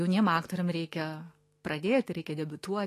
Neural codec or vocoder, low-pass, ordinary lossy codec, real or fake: autoencoder, 48 kHz, 128 numbers a frame, DAC-VAE, trained on Japanese speech; 14.4 kHz; AAC, 48 kbps; fake